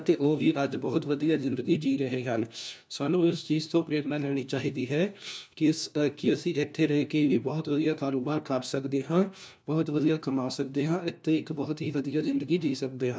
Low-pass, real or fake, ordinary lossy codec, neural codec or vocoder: none; fake; none; codec, 16 kHz, 1 kbps, FunCodec, trained on LibriTTS, 50 frames a second